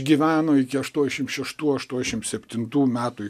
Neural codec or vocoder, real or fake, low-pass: none; real; 14.4 kHz